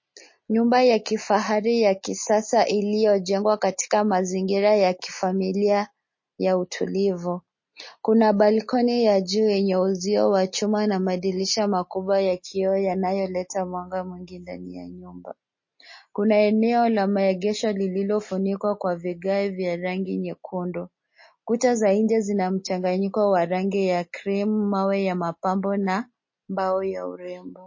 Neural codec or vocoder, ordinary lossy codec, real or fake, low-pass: none; MP3, 32 kbps; real; 7.2 kHz